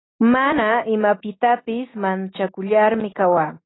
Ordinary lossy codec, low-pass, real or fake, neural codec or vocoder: AAC, 16 kbps; 7.2 kHz; real; none